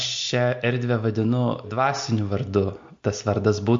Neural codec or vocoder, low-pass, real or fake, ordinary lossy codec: none; 7.2 kHz; real; MP3, 48 kbps